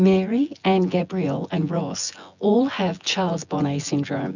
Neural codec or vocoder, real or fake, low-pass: vocoder, 24 kHz, 100 mel bands, Vocos; fake; 7.2 kHz